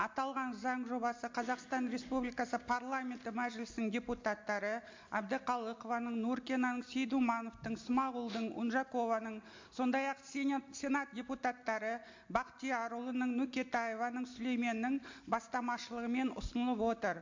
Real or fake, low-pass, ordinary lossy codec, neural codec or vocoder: real; 7.2 kHz; MP3, 48 kbps; none